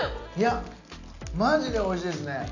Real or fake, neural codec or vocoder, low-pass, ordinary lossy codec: real; none; 7.2 kHz; none